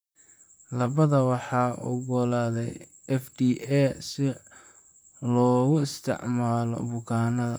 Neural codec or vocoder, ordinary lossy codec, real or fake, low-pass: vocoder, 44.1 kHz, 128 mel bands, Pupu-Vocoder; none; fake; none